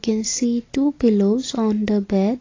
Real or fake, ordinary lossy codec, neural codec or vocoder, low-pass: real; AAC, 32 kbps; none; 7.2 kHz